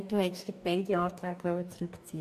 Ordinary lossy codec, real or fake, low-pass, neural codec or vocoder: none; fake; 14.4 kHz; codec, 44.1 kHz, 2.6 kbps, DAC